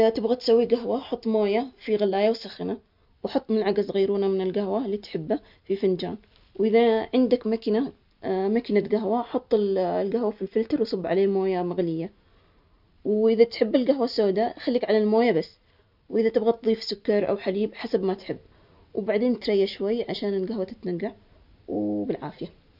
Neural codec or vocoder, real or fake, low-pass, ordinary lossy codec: none; real; 5.4 kHz; none